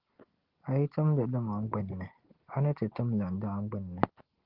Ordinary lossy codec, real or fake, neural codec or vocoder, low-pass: Opus, 16 kbps; real; none; 5.4 kHz